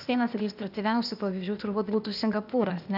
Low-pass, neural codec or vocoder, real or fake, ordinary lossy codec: 5.4 kHz; codec, 16 kHz, 0.8 kbps, ZipCodec; fake; AAC, 48 kbps